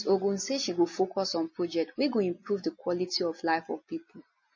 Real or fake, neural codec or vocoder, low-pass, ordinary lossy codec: real; none; 7.2 kHz; MP3, 32 kbps